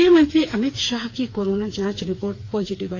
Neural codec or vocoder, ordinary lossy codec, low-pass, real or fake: codec, 16 kHz, 4 kbps, FreqCodec, smaller model; AAC, 32 kbps; 7.2 kHz; fake